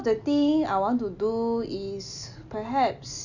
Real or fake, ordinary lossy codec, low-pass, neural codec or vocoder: real; none; 7.2 kHz; none